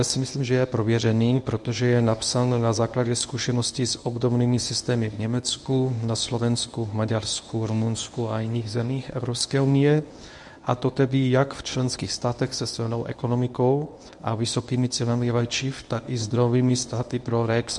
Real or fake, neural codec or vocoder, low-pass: fake; codec, 24 kHz, 0.9 kbps, WavTokenizer, medium speech release version 1; 10.8 kHz